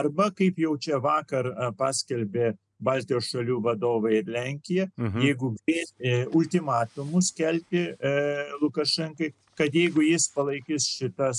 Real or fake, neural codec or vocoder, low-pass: real; none; 10.8 kHz